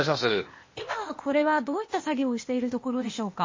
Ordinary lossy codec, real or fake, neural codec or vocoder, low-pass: MP3, 32 kbps; fake; codec, 16 kHz, 0.8 kbps, ZipCodec; 7.2 kHz